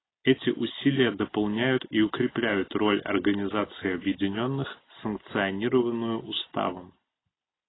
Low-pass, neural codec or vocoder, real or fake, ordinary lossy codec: 7.2 kHz; none; real; AAC, 16 kbps